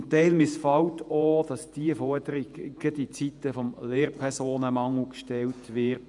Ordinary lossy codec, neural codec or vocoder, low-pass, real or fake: none; vocoder, 48 kHz, 128 mel bands, Vocos; 10.8 kHz; fake